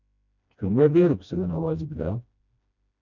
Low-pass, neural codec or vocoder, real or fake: 7.2 kHz; codec, 16 kHz, 1 kbps, FreqCodec, smaller model; fake